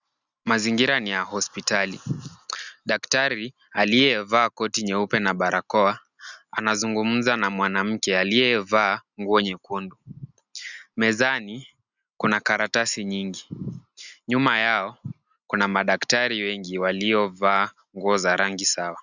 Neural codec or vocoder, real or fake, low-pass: none; real; 7.2 kHz